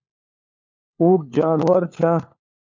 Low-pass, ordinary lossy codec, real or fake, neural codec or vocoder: 7.2 kHz; MP3, 64 kbps; fake; codec, 16 kHz, 4 kbps, FunCodec, trained on LibriTTS, 50 frames a second